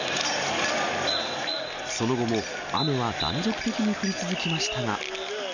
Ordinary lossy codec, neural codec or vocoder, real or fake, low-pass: none; none; real; 7.2 kHz